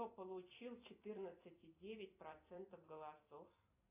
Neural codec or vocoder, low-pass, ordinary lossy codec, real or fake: vocoder, 44.1 kHz, 128 mel bands every 256 samples, BigVGAN v2; 3.6 kHz; AAC, 24 kbps; fake